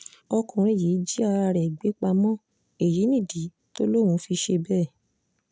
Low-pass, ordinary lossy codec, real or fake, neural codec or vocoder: none; none; real; none